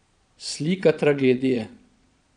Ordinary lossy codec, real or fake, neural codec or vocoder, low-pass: none; fake; vocoder, 22.05 kHz, 80 mel bands, Vocos; 9.9 kHz